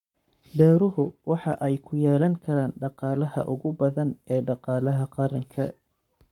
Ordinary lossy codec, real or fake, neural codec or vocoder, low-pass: none; fake; codec, 44.1 kHz, 7.8 kbps, Pupu-Codec; 19.8 kHz